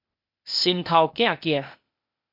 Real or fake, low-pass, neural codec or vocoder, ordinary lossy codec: fake; 5.4 kHz; codec, 16 kHz, 0.8 kbps, ZipCodec; MP3, 48 kbps